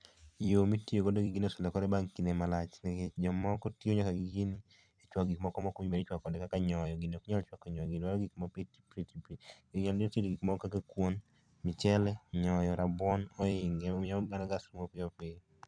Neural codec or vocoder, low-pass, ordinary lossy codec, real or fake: vocoder, 44.1 kHz, 128 mel bands every 256 samples, BigVGAN v2; 9.9 kHz; none; fake